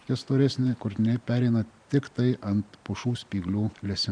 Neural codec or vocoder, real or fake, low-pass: vocoder, 44.1 kHz, 128 mel bands every 512 samples, BigVGAN v2; fake; 9.9 kHz